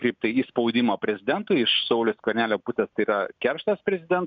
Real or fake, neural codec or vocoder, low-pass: real; none; 7.2 kHz